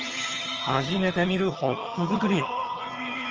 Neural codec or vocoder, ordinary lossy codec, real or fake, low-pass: vocoder, 22.05 kHz, 80 mel bands, HiFi-GAN; Opus, 24 kbps; fake; 7.2 kHz